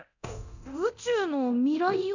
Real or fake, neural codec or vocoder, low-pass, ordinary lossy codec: fake; codec, 24 kHz, 0.9 kbps, DualCodec; 7.2 kHz; none